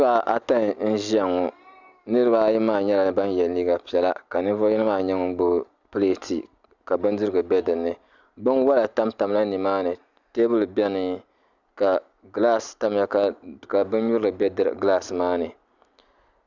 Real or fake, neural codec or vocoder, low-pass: real; none; 7.2 kHz